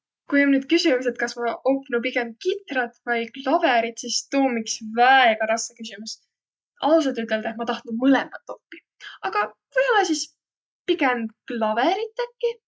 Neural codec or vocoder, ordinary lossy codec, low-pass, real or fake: none; none; none; real